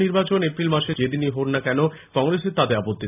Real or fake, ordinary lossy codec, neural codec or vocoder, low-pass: real; none; none; 3.6 kHz